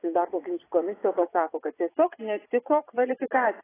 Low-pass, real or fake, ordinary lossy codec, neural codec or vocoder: 3.6 kHz; fake; AAC, 16 kbps; vocoder, 22.05 kHz, 80 mel bands, Vocos